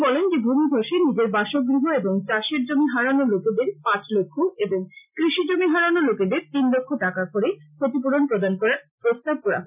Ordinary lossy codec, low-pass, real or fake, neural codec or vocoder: none; 3.6 kHz; real; none